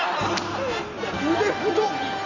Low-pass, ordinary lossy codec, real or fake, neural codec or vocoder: 7.2 kHz; none; real; none